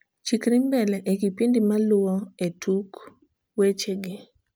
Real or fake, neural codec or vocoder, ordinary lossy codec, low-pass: real; none; none; none